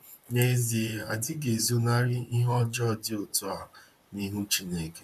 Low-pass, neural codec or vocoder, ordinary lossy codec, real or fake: 14.4 kHz; vocoder, 44.1 kHz, 128 mel bands, Pupu-Vocoder; none; fake